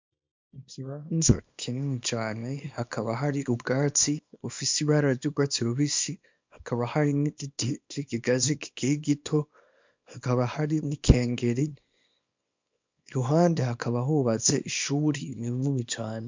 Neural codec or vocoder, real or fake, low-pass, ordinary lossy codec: codec, 24 kHz, 0.9 kbps, WavTokenizer, small release; fake; 7.2 kHz; MP3, 64 kbps